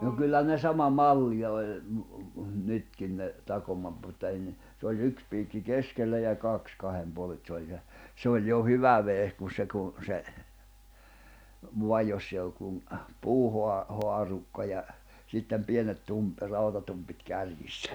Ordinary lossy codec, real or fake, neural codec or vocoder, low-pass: none; real; none; none